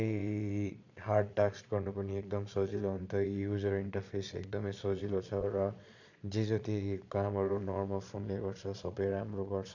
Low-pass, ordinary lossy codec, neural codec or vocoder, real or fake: 7.2 kHz; none; vocoder, 22.05 kHz, 80 mel bands, Vocos; fake